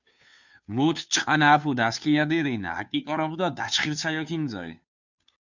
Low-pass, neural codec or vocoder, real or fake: 7.2 kHz; codec, 16 kHz, 2 kbps, FunCodec, trained on Chinese and English, 25 frames a second; fake